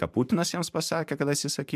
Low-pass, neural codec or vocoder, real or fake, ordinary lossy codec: 14.4 kHz; vocoder, 44.1 kHz, 128 mel bands every 512 samples, BigVGAN v2; fake; MP3, 96 kbps